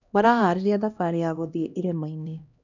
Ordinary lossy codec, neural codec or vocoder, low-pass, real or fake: none; codec, 16 kHz, 1 kbps, X-Codec, HuBERT features, trained on LibriSpeech; 7.2 kHz; fake